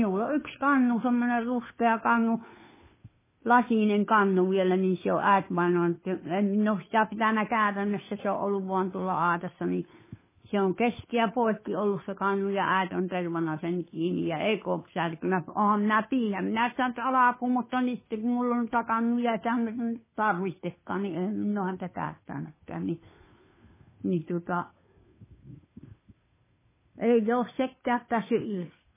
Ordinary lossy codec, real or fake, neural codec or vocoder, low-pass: MP3, 16 kbps; fake; codec, 16 kHz, 2 kbps, FunCodec, trained on Chinese and English, 25 frames a second; 3.6 kHz